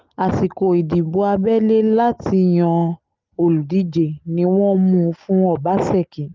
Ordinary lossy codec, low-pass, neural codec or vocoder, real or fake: Opus, 24 kbps; 7.2 kHz; none; real